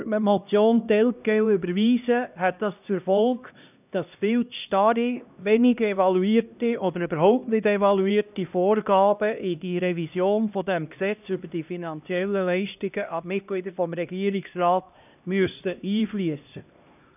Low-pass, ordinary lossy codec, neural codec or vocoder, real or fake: 3.6 kHz; none; codec, 16 kHz, 1 kbps, X-Codec, HuBERT features, trained on LibriSpeech; fake